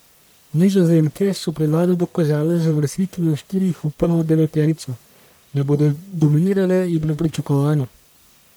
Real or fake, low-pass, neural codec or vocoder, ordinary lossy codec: fake; none; codec, 44.1 kHz, 1.7 kbps, Pupu-Codec; none